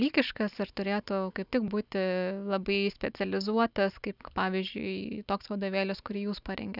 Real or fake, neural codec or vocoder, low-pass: real; none; 5.4 kHz